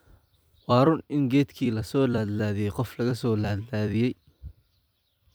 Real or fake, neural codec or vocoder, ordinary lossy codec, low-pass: fake; vocoder, 44.1 kHz, 128 mel bands every 256 samples, BigVGAN v2; none; none